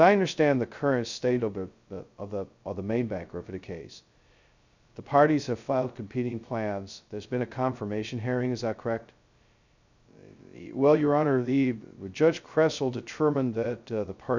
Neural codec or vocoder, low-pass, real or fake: codec, 16 kHz, 0.2 kbps, FocalCodec; 7.2 kHz; fake